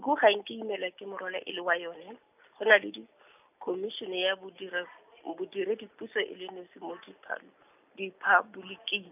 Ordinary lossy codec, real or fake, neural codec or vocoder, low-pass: none; real; none; 3.6 kHz